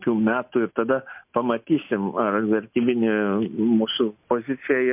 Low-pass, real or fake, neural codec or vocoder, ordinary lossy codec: 3.6 kHz; real; none; MP3, 32 kbps